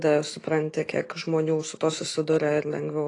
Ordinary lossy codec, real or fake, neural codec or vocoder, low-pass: AAC, 32 kbps; fake; autoencoder, 48 kHz, 128 numbers a frame, DAC-VAE, trained on Japanese speech; 10.8 kHz